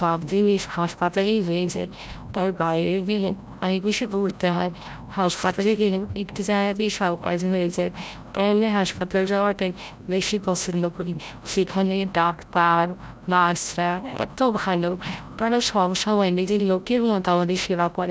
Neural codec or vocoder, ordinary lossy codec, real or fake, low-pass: codec, 16 kHz, 0.5 kbps, FreqCodec, larger model; none; fake; none